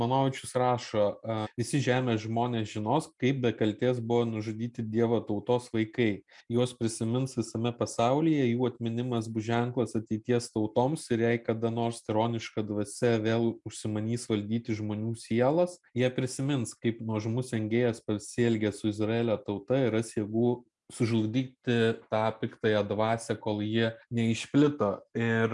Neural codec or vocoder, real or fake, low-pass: none; real; 10.8 kHz